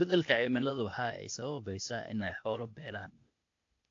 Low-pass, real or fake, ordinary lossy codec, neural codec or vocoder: 7.2 kHz; fake; AAC, 48 kbps; codec, 16 kHz, 0.8 kbps, ZipCodec